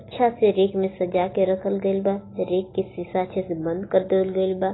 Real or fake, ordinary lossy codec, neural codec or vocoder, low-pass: real; AAC, 16 kbps; none; 7.2 kHz